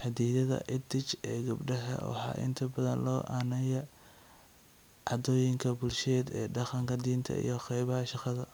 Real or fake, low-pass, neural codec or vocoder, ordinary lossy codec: real; none; none; none